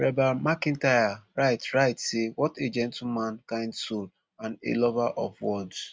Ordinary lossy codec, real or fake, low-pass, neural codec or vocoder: none; real; none; none